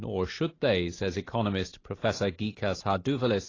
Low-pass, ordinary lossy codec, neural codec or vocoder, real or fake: 7.2 kHz; AAC, 32 kbps; none; real